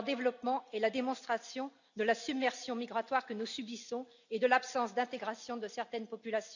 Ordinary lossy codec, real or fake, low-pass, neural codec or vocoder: none; real; 7.2 kHz; none